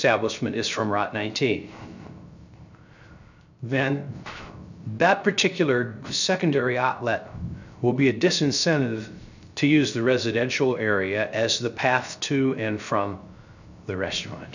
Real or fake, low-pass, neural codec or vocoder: fake; 7.2 kHz; codec, 16 kHz, 0.3 kbps, FocalCodec